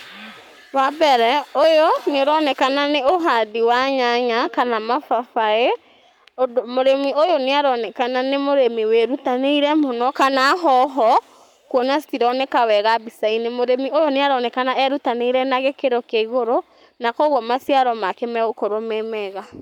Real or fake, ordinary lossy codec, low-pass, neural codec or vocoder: fake; none; 19.8 kHz; codec, 44.1 kHz, 7.8 kbps, Pupu-Codec